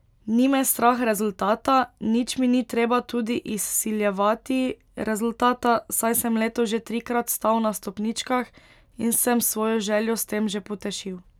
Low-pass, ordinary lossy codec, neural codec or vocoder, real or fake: 19.8 kHz; none; none; real